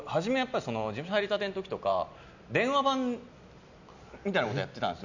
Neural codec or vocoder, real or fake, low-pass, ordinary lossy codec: none; real; 7.2 kHz; none